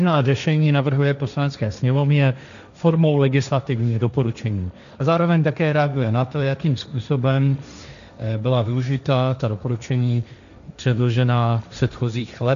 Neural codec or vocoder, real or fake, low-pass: codec, 16 kHz, 1.1 kbps, Voila-Tokenizer; fake; 7.2 kHz